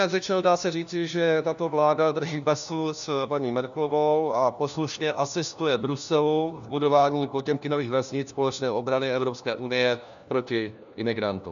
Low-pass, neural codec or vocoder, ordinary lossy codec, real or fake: 7.2 kHz; codec, 16 kHz, 1 kbps, FunCodec, trained on LibriTTS, 50 frames a second; MP3, 96 kbps; fake